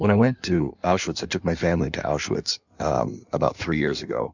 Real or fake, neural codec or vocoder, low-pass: fake; codec, 16 kHz in and 24 kHz out, 1.1 kbps, FireRedTTS-2 codec; 7.2 kHz